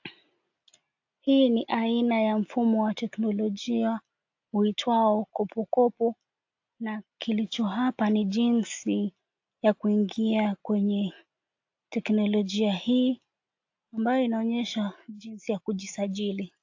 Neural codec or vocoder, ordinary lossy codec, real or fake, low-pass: none; AAC, 48 kbps; real; 7.2 kHz